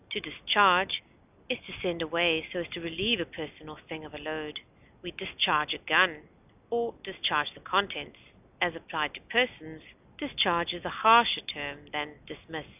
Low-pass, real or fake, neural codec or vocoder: 3.6 kHz; real; none